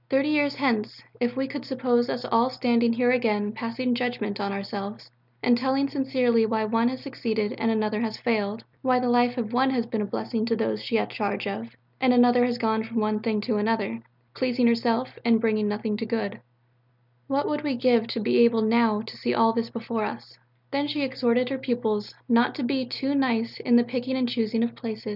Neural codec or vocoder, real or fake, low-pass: none; real; 5.4 kHz